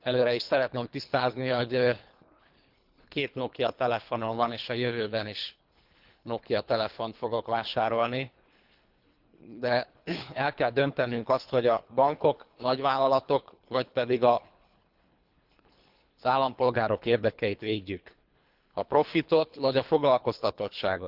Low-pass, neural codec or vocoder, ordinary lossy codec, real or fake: 5.4 kHz; codec, 24 kHz, 3 kbps, HILCodec; Opus, 32 kbps; fake